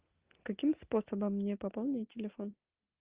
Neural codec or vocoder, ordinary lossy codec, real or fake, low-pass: none; Opus, 32 kbps; real; 3.6 kHz